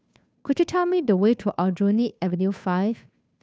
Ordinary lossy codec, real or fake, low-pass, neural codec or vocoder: none; fake; none; codec, 16 kHz, 2 kbps, FunCodec, trained on Chinese and English, 25 frames a second